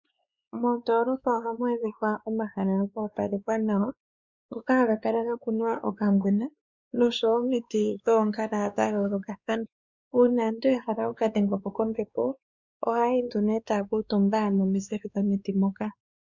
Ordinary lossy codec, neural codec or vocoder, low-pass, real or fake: Opus, 64 kbps; codec, 16 kHz, 2 kbps, X-Codec, WavLM features, trained on Multilingual LibriSpeech; 7.2 kHz; fake